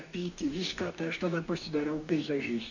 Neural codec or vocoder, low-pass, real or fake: codec, 44.1 kHz, 2.6 kbps, DAC; 7.2 kHz; fake